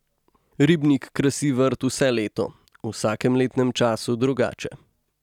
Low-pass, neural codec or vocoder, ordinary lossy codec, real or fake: 19.8 kHz; none; none; real